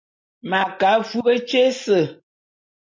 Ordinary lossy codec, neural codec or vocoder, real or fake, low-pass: MP3, 48 kbps; none; real; 7.2 kHz